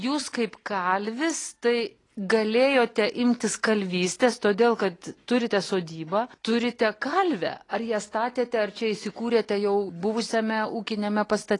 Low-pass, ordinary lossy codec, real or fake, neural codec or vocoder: 10.8 kHz; AAC, 32 kbps; real; none